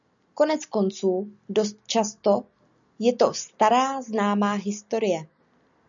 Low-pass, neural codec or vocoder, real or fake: 7.2 kHz; none; real